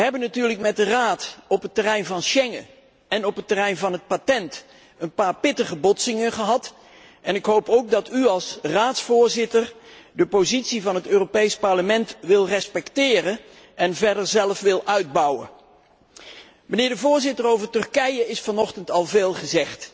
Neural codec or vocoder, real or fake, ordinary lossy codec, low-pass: none; real; none; none